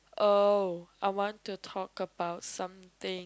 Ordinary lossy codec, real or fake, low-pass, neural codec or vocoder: none; real; none; none